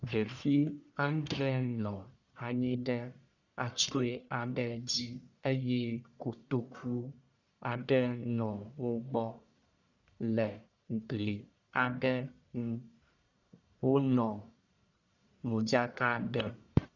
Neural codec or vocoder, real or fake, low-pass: codec, 44.1 kHz, 1.7 kbps, Pupu-Codec; fake; 7.2 kHz